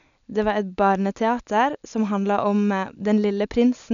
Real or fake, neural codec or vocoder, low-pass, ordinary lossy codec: real; none; 7.2 kHz; none